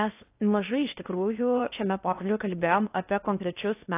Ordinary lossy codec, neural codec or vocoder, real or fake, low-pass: AAC, 24 kbps; codec, 16 kHz in and 24 kHz out, 0.8 kbps, FocalCodec, streaming, 65536 codes; fake; 3.6 kHz